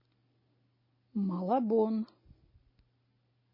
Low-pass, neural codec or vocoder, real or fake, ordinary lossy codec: 5.4 kHz; none; real; MP3, 24 kbps